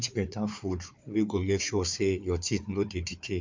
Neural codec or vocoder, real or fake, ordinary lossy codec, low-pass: codec, 16 kHz, 4 kbps, FunCodec, trained on Chinese and English, 50 frames a second; fake; MP3, 64 kbps; 7.2 kHz